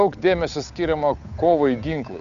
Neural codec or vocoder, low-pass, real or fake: codec, 16 kHz, 8 kbps, FunCodec, trained on Chinese and English, 25 frames a second; 7.2 kHz; fake